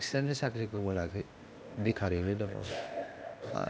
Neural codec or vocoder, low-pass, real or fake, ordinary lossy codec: codec, 16 kHz, 0.8 kbps, ZipCodec; none; fake; none